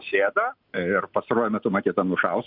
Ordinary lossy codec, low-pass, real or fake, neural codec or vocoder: MP3, 48 kbps; 5.4 kHz; real; none